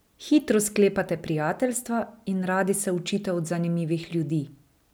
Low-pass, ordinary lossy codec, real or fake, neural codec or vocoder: none; none; real; none